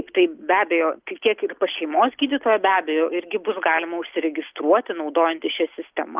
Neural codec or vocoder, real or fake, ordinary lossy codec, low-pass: none; real; Opus, 32 kbps; 3.6 kHz